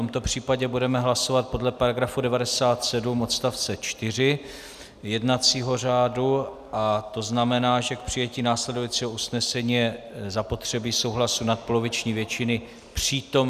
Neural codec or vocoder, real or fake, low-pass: none; real; 14.4 kHz